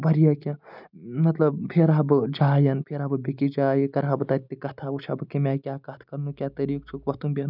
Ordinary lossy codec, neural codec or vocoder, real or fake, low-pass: none; none; real; 5.4 kHz